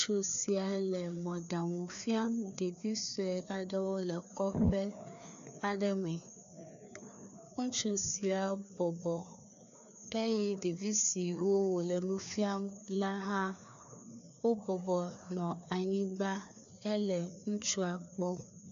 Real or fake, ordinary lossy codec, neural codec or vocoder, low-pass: fake; MP3, 96 kbps; codec, 16 kHz, 2 kbps, FreqCodec, larger model; 7.2 kHz